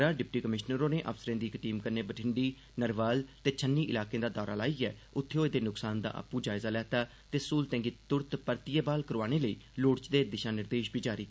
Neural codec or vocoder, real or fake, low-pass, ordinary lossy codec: none; real; none; none